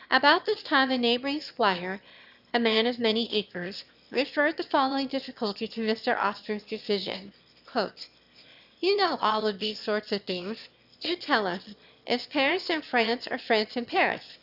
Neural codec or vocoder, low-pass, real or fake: autoencoder, 22.05 kHz, a latent of 192 numbers a frame, VITS, trained on one speaker; 5.4 kHz; fake